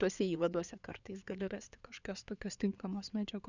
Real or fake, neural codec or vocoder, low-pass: fake; codec, 16 kHz in and 24 kHz out, 2.2 kbps, FireRedTTS-2 codec; 7.2 kHz